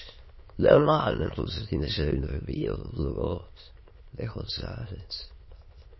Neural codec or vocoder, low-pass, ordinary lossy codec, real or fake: autoencoder, 22.05 kHz, a latent of 192 numbers a frame, VITS, trained on many speakers; 7.2 kHz; MP3, 24 kbps; fake